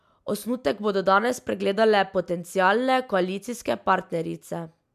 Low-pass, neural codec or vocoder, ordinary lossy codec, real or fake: 14.4 kHz; none; MP3, 96 kbps; real